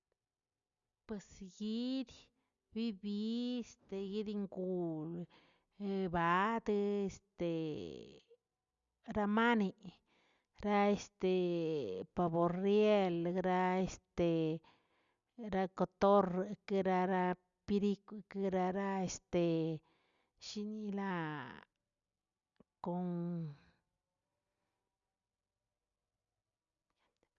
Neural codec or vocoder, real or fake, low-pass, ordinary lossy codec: none; real; 7.2 kHz; Opus, 64 kbps